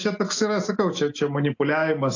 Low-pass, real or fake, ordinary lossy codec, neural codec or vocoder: 7.2 kHz; real; AAC, 48 kbps; none